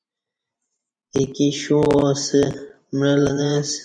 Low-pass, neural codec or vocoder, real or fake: 9.9 kHz; vocoder, 44.1 kHz, 128 mel bands every 512 samples, BigVGAN v2; fake